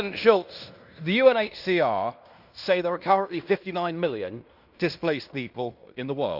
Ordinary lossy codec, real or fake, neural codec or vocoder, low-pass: Opus, 64 kbps; fake; codec, 16 kHz in and 24 kHz out, 0.9 kbps, LongCat-Audio-Codec, fine tuned four codebook decoder; 5.4 kHz